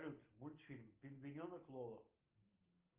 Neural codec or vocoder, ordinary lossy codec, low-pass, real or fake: none; Opus, 24 kbps; 3.6 kHz; real